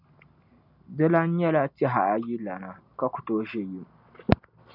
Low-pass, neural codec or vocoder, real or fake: 5.4 kHz; none; real